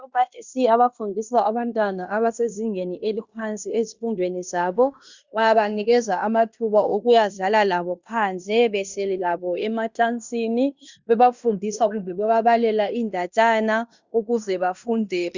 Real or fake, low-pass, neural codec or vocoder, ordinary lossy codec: fake; 7.2 kHz; codec, 16 kHz in and 24 kHz out, 0.9 kbps, LongCat-Audio-Codec, fine tuned four codebook decoder; Opus, 64 kbps